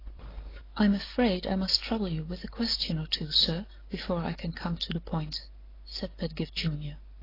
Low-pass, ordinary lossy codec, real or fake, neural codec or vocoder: 5.4 kHz; AAC, 24 kbps; real; none